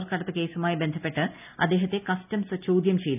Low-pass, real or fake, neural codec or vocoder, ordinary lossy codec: 3.6 kHz; real; none; none